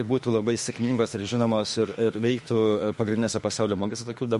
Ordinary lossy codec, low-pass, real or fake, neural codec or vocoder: MP3, 48 kbps; 14.4 kHz; fake; autoencoder, 48 kHz, 32 numbers a frame, DAC-VAE, trained on Japanese speech